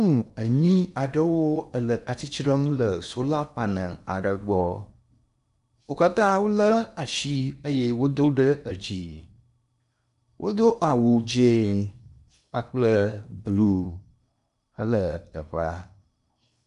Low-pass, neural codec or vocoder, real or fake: 10.8 kHz; codec, 16 kHz in and 24 kHz out, 0.8 kbps, FocalCodec, streaming, 65536 codes; fake